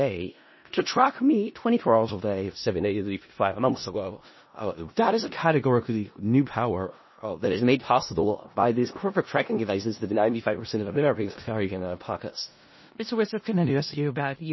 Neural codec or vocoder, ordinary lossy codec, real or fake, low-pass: codec, 16 kHz in and 24 kHz out, 0.4 kbps, LongCat-Audio-Codec, four codebook decoder; MP3, 24 kbps; fake; 7.2 kHz